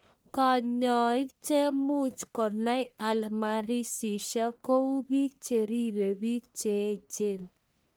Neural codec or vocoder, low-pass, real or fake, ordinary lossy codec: codec, 44.1 kHz, 1.7 kbps, Pupu-Codec; none; fake; none